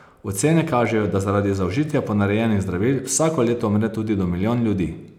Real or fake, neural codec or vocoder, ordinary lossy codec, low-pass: real; none; none; 19.8 kHz